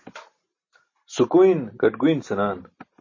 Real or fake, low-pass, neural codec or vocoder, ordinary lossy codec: real; 7.2 kHz; none; MP3, 32 kbps